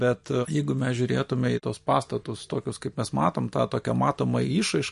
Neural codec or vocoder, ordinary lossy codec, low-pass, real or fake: none; MP3, 48 kbps; 14.4 kHz; real